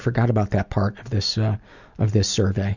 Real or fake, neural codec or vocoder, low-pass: real; none; 7.2 kHz